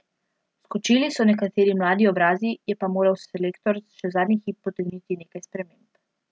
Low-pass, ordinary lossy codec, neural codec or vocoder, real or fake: none; none; none; real